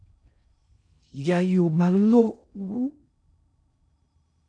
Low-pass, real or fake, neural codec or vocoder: 9.9 kHz; fake; codec, 16 kHz in and 24 kHz out, 0.6 kbps, FocalCodec, streaming, 2048 codes